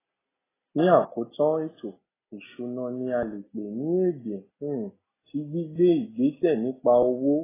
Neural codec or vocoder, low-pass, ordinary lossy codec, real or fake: none; 3.6 kHz; AAC, 16 kbps; real